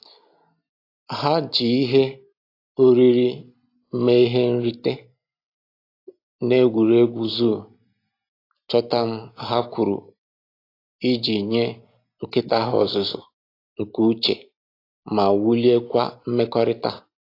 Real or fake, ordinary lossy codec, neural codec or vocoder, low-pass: real; AAC, 32 kbps; none; 5.4 kHz